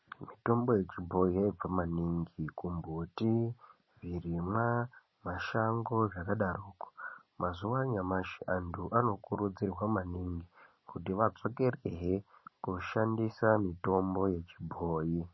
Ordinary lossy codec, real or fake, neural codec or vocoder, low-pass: MP3, 24 kbps; real; none; 7.2 kHz